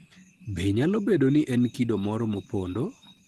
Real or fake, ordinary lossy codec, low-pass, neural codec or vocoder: real; Opus, 16 kbps; 14.4 kHz; none